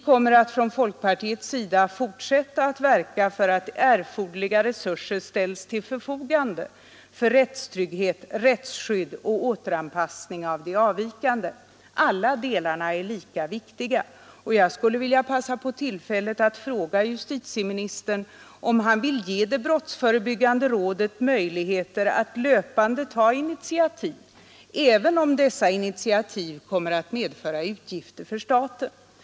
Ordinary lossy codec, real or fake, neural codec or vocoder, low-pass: none; real; none; none